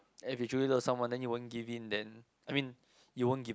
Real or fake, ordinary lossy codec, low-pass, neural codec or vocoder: real; none; none; none